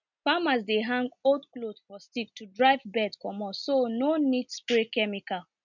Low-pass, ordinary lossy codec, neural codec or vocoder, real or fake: 7.2 kHz; none; none; real